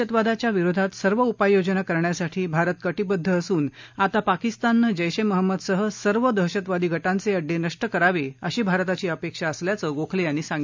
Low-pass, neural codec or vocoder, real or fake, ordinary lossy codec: 7.2 kHz; none; real; MP3, 64 kbps